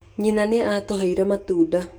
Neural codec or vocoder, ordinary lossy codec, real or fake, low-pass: codec, 44.1 kHz, 7.8 kbps, DAC; none; fake; none